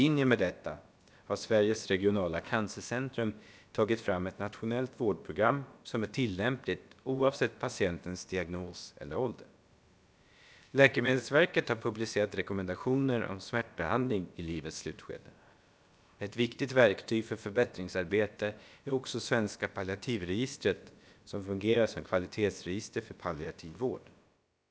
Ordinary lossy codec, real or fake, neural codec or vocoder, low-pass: none; fake; codec, 16 kHz, about 1 kbps, DyCAST, with the encoder's durations; none